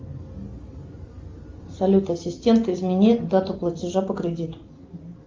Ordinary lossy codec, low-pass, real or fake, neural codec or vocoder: Opus, 32 kbps; 7.2 kHz; real; none